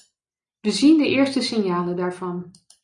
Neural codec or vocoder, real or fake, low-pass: none; real; 10.8 kHz